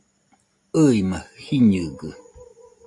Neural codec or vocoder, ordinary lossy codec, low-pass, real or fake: none; AAC, 48 kbps; 10.8 kHz; real